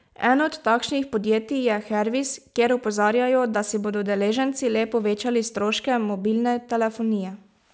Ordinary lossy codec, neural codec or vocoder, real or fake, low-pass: none; none; real; none